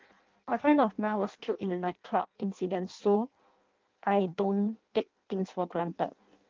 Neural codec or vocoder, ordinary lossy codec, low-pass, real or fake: codec, 16 kHz in and 24 kHz out, 0.6 kbps, FireRedTTS-2 codec; Opus, 32 kbps; 7.2 kHz; fake